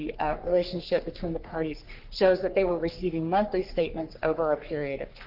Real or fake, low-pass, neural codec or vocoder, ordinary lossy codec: fake; 5.4 kHz; codec, 44.1 kHz, 3.4 kbps, Pupu-Codec; Opus, 24 kbps